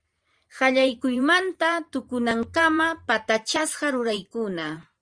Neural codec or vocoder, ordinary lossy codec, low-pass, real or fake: vocoder, 44.1 kHz, 128 mel bands every 512 samples, BigVGAN v2; Opus, 32 kbps; 9.9 kHz; fake